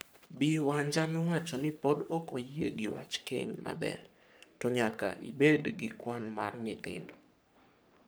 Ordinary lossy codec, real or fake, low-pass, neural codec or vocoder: none; fake; none; codec, 44.1 kHz, 3.4 kbps, Pupu-Codec